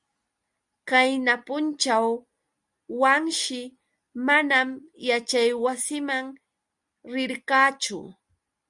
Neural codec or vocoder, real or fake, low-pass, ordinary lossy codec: none; real; 10.8 kHz; Opus, 64 kbps